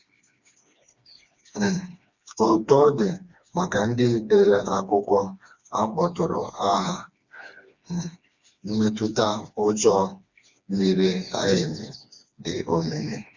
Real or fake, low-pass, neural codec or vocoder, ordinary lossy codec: fake; 7.2 kHz; codec, 16 kHz, 2 kbps, FreqCodec, smaller model; Opus, 64 kbps